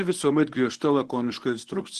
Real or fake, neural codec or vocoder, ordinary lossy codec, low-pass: fake; codec, 24 kHz, 0.9 kbps, WavTokenizer, medium speech release version 1; Opus, 16 kbps; 10.8 kHz